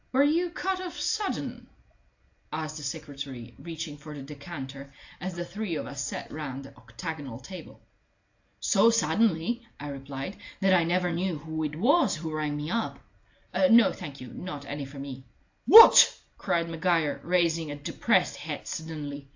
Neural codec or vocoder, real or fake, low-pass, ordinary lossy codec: none; real; 7.2 kHz; AAC, 48 kbps